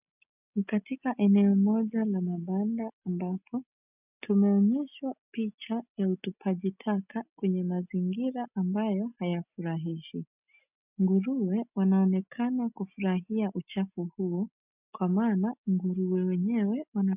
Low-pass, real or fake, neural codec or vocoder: 3.6 kHz; real; none